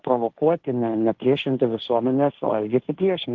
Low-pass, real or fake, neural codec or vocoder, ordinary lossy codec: 7.2 kHz; fake; codec, 16 kHz, 1.1 kbps, Voila-Tokenizer; Opus, 16 kbps